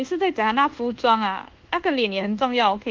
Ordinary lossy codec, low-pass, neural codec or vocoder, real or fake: Opus, 16 kbps; 7.2 kHz; codec, 24 kHz, 1.2 kbps, DualCodec; fake